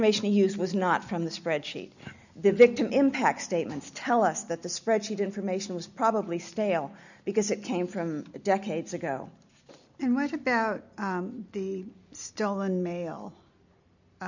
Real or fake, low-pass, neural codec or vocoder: real; 7.2 kHz; none